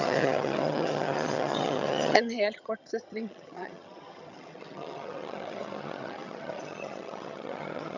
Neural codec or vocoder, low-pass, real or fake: vocoder, 22.05 kHz, 80 mel bands, HiFi-GAN; 7.2 kHz; fake